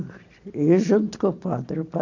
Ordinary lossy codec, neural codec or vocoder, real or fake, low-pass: AAC, 48 kbps; none; real; 7.2 kHz